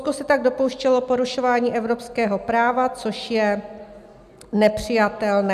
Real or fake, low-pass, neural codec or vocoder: real; 14.4 kHz; none